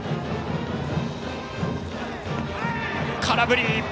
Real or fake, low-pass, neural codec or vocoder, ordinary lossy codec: real; none; none; none